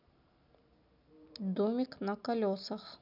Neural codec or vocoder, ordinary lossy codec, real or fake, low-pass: none; none; real; 5.4 kHz